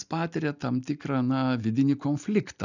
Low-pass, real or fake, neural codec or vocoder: 7.2 kHz; real; none